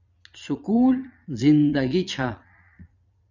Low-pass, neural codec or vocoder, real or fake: 7.2 kHz; vocoder, 22.05 kHz, 80 mel bands, Vocos; fake